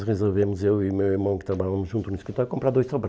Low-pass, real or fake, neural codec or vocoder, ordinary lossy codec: none; real; none; none